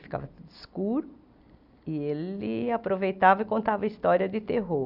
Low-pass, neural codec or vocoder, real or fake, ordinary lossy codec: 5.4 kHz; none; real; Opus, 64 kbps